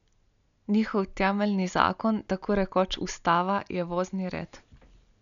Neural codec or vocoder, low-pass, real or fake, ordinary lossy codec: none; 7.2 kHz; real; MP3, 96 kbps